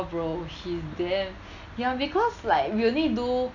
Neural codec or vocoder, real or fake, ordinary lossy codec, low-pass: none; real; none; 7.2 kHz